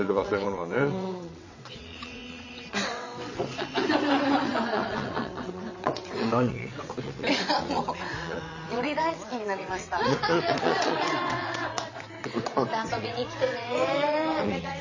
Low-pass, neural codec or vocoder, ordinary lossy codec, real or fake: 7.2 kHz; vocoder, 22.05 kHz, 80 mel bands, WaveNeXt; MP3, 32 kbps; fake